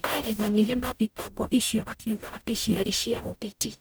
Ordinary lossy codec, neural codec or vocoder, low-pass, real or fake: none; codec, 44.1 kHz, 0.9 kbps, DAC; none; fake